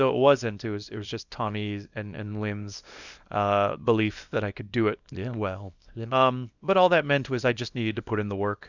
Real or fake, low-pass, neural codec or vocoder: fake; 7.2 kHz; codec, 24 kHz, 0.9 kbps, WavTokenizer, small release